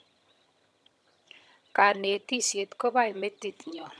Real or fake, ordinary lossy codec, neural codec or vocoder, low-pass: fake; none; vocoder, 22.05 kHz, 80 mel bands, HiFi-GAN; none